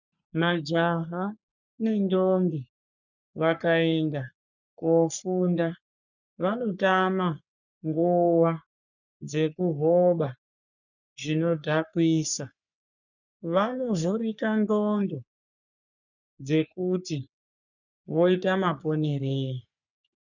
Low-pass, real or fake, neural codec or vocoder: 7.2 kHz; fake; codec, 44.1 kHz, 3.4 kbps, Pupu-Codec